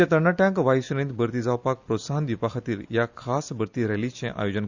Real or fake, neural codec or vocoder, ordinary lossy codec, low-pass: real; none; Opus, 64 kbps; 7.2 kHz